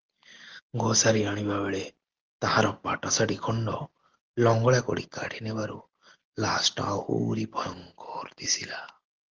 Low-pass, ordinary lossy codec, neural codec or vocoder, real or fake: 7.2 kHz; Opus, 16 kbps; none; real